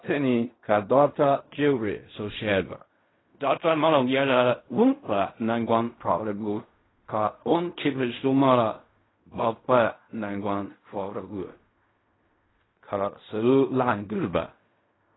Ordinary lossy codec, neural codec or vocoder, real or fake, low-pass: AAC, 16 kbps; codec, 16 kHz in and 24 kHz out, 0.4 kbps, LongCat-Audio-Codec, fine tuned four codebook decoder; fake; 7.2 kHz